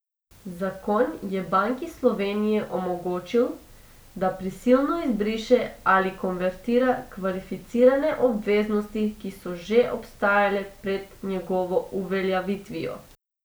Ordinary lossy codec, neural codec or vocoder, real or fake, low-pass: none; none; real; none